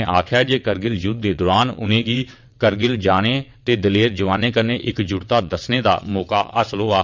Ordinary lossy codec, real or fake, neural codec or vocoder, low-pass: MP3, 64 kbps; fake; vocoder, 22.05 kHz, 80 mel bands, WaveNeXt; 7.2 kHz